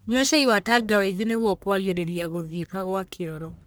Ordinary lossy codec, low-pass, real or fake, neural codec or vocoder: none; none; fake; codec, 44.1 kHz, 1.7 kbps, Pupu-Codec